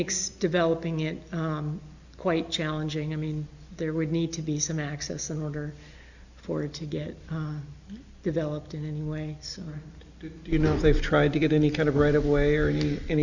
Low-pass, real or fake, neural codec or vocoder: 7.2 kHz; real; none